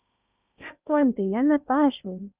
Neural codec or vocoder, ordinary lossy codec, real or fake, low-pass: codec, 16 kHz in and 24 kHz out, 0.8 kbps, FocalCodec, streaming, 65536 codes; Opus, 64 kbps; fake; 3.6 kHz